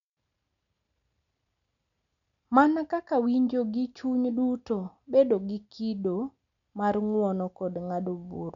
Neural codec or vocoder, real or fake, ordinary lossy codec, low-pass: none; real; none; 7.2 kHz